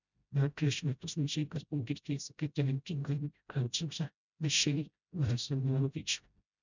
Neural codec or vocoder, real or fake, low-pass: codec, 16 kHz, 0.5 kbps, FreqCodec, smaller model; fake; 7.2 kHz